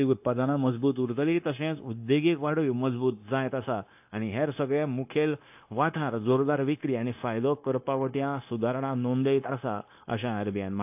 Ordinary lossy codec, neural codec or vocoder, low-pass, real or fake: AAC, 32 kbps; codec, 16 kHz, 0.9 kbps, LongCat-Audio-Codec; 3.6 kHz; fake